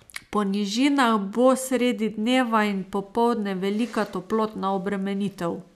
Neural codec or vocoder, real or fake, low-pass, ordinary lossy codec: none; real; 14.4 kHz; none